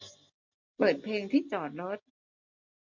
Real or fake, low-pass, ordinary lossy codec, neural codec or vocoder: real; 7.2 kHz; MP3, 48 kbps; none